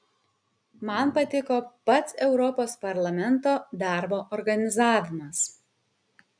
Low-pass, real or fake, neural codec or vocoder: 9.9 kHz; real; none